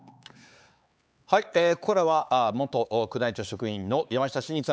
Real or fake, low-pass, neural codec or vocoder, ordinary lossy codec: fake; none; codec, 16 kHz, 4 kbps, X-Codec, HuBERT features, trained on LibriSpeech; none